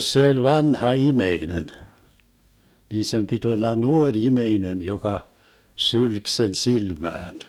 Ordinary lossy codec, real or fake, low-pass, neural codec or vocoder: none; fake; 19.8 kHz; codec, 44.1 kHz, 2.6 kbps, DAC